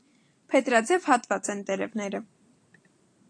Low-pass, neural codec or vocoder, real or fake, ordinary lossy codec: 9.9 kHz; none; real; AAC, 48 kbps